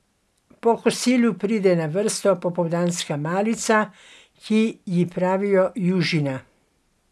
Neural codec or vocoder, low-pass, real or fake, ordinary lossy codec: none; none; real; none